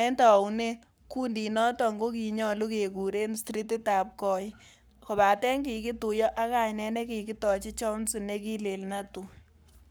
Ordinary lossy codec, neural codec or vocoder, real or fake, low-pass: none; codec, 44.1 kHz, 7.8 kbps, Pupu-Codec; fake; none